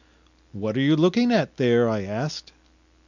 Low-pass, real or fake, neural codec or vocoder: 7.2 kHz; real; none